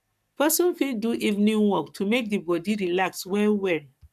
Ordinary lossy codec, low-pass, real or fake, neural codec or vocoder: none; 14.4 kHz; fake; codec, 44.1 kHz, 7.8 kbps, Pupu-Codec